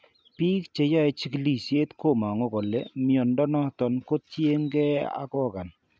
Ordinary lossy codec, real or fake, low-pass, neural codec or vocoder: none; real; none; none